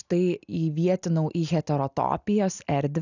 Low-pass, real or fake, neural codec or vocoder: 7.2 kHz; real; none